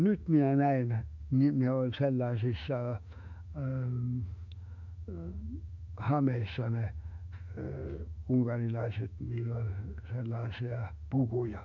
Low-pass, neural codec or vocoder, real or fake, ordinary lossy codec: 7.2 kHz; autoencoder, 48 kHz, 32 numbers a frame, DAC-VAE, trained on Japanese speech; fake; none